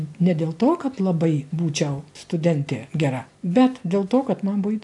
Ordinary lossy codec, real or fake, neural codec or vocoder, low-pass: AAC, 64 kbps; real; none; 10.8 kHz